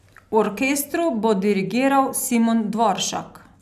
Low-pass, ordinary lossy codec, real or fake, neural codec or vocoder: 14.4 kHz; none; real; none